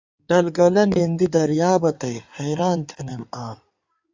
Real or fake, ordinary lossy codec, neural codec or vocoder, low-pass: fake; AAC, 48 kbps; codec, 16 kHz in and 24 kHz out, 2.2 kbps, FireRedTTS-2 codec; 7.2 kHz